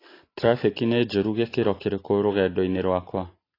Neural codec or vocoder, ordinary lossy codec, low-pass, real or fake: none; AAC, 24 kbps; 5.4 kHz; real